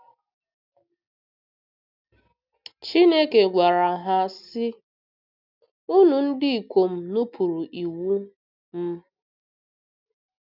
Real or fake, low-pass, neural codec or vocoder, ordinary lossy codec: real; 5.4 kHz; none; none